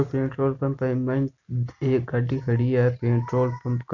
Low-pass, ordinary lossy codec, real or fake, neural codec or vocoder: 7.2 kHz; none; real; none